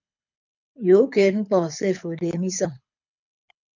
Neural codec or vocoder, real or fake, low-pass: codec, 24 kHz, 6 kbps, HILCodec; fake; 7.2 kHz